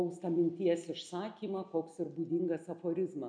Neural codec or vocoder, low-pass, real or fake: none; 9.9 kHz; real